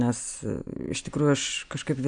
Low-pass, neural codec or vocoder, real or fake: 9.9 kHz; none; real